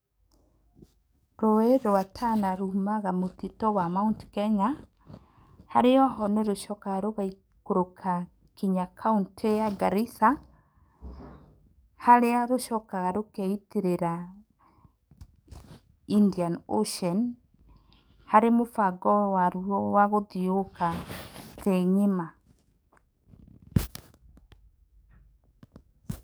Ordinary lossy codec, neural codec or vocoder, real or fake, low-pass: none; codec, 44.1 kHz, 7.8 kbps, DAC; fake; none